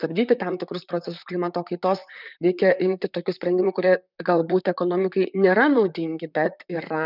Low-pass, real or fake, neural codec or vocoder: 5.4 kHz; fake; vocoder, 44.1 kHz, 128 mel bands, Pupu-Vocoder